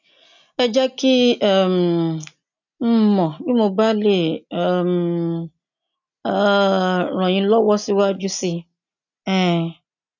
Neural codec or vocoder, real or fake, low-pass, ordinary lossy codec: none; real; 7.2 kHz; none